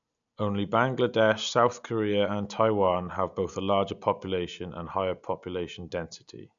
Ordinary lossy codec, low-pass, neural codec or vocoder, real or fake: none; 7.2 kHz; none; real